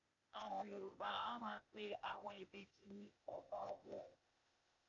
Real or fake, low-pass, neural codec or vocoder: fake; 7.2 kHz; codec, 16 kHz, 0.8 kbps, ZipCodec